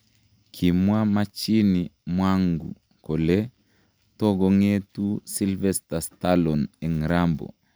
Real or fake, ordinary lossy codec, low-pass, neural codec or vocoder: real; none; none; none